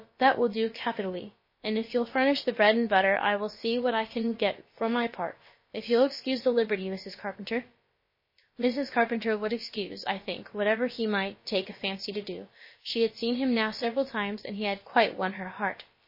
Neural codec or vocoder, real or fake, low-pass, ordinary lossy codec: codec, 16 kHz, about 1 kbps, DyCAST, with the encoder's durations; fake; 5.4 kHz; MP3, 24 kbps